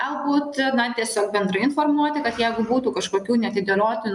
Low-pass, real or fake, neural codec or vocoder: 10.8 kHz; real; none